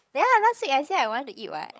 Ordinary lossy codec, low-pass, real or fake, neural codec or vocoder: none; none; fake; codec, 16 kHz, 16 kbps, FreqCodec, larger model